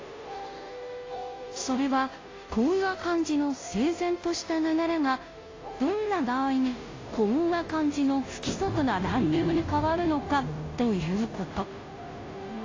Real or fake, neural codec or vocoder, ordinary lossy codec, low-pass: fake; codec, 16 kHz, 0.5 kbps, FunCodec, trained on Chinese and English, 25 frames a second; AAC, 32 kbps; 7.2 kHz